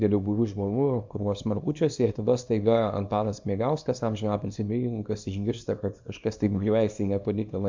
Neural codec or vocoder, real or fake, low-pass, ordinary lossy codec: codec, 24 kHz, 0.9 kbps, WavTokenizer, small release; fake; 7.2 kHz; MP3, 64 kbps